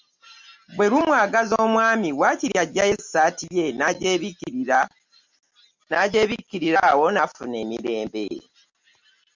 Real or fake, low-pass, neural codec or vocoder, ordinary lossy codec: real; 7.2 kHz; none; MP3, 64 kbps